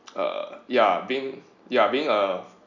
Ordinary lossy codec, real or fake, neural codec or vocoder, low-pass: none; real; none; 7.2 kHz